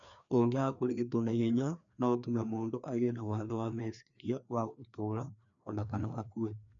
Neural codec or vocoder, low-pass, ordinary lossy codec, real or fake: codec, 16 kHz, 2 kbps, FreqCodec, larger model; 7.2 kHz; none; fake